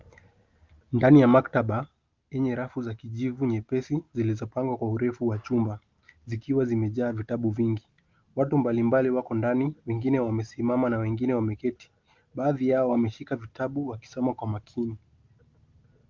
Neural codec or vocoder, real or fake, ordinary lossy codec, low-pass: none; real; Opus, 24 kbps; 7.2 kHz